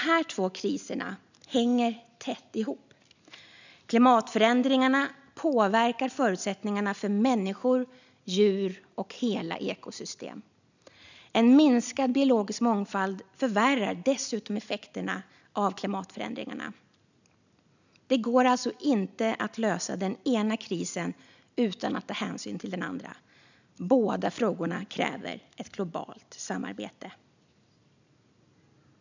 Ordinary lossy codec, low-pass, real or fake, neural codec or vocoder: none; 7.2 kHz; real; none